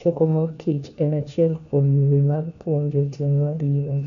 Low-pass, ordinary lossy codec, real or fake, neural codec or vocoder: 7.2 kHz; none; fake; codec, 16 kHz, 1 kbps, FunCodec, trained on LibriTTS, 50 frames a second